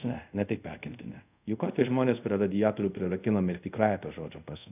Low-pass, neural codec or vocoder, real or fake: 3.6 kHz; codec, 24 kHz, 0.5 kbps, DualCodec; fake